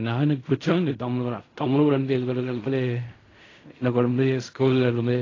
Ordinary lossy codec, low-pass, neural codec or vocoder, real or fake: AAC, 32 kbps; 7.2 kHz; codec, 16 kHz in and 24 kHz out, 0.4 kbps, LongCat-Audio-Codec, fine tuned four codebook decoder; fake